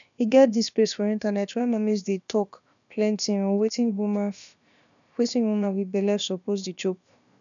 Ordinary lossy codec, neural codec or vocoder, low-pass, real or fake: none; codec, 16 kHz, about 1 kbps, DyCAST, with the encoder's durations; 7.2 kHz; fake